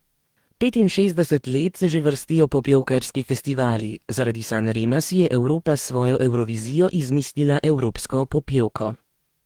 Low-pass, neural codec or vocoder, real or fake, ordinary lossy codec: 19.8 kHz; codec, 44.1 kHz, 2.6 kbps, DAC; fake; Opus, 24 kbps